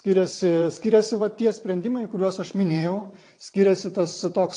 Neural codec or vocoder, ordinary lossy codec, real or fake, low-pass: vocoder, 22.05 kHz, 80 mel bands, WaveNeXt; AAC, 48 kbps; fake; 9.9 kHz